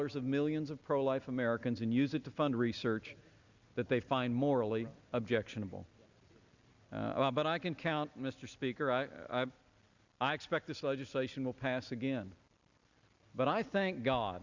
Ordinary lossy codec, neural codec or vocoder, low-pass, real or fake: Opus, 64 kbps; none; 7.2 kHz; real